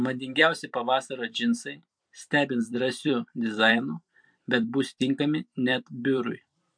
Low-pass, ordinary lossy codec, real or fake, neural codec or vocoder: 9.9 kHz; MP3, 64 kbps; fake; vocoder, 44.1 kHz, 128 mel bands every 256 samples, BigVGAN v2